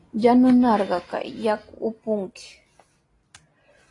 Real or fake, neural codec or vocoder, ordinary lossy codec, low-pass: real; none; AAC, 32 kbps; 10.8 kHz